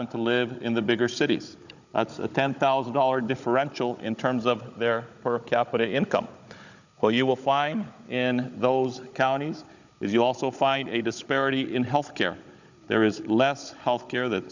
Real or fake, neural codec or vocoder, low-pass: fake; codec, 16 kHz, 16 kbps, FunCodec, trained on Chinese and English, 50 frames a second; 7.2 kHz